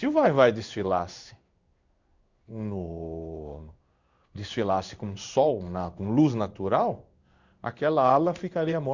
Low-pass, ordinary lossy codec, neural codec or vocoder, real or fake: 7.2 kHz; Opus, 64 kbps; codec, 16 kHz in and 24 kHz out, 1 kbps, XY-Tokenizer; fake